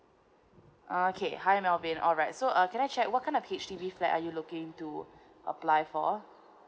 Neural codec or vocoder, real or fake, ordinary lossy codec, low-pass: none; real; none; none